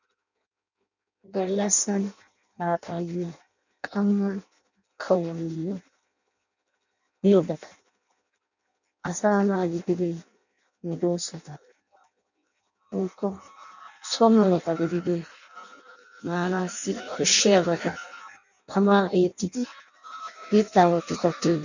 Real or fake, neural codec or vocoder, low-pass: fake; codec, 16 kHz in and 24 kHz out, 0.6 kbps, FireRedTTS-2 codec; 7.2 kHz